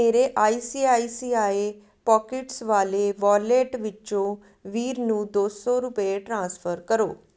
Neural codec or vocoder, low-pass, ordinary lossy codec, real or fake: none; none; none; real